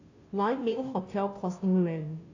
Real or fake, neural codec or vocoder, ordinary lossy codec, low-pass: fake; codec, 16 kHz, 0.5 kbps, FunCodec, trained on Chinese and English, 25 frames a second; AAC, 48 kbps; 7.2 kHz